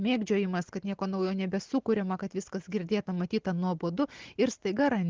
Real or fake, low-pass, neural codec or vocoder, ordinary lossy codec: real; 7.2 kHz; none; Opus, 16 kbps